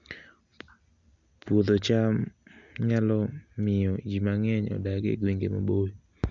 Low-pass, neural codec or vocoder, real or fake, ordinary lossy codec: 7.2 kHz; none; real; MP3, 64 kbps